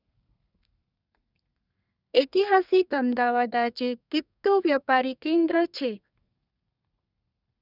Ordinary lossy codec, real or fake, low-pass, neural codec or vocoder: none; fake; 5.4 kHz; codec, 32 kHz, 1.9 kbps, SNAC